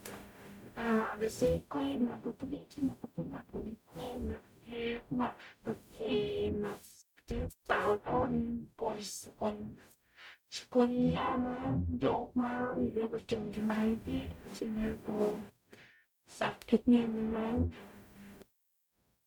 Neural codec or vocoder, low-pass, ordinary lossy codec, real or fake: codec, 44.1 kHz, 0.9 kbps, DAC; 19.8 kHz; none; fake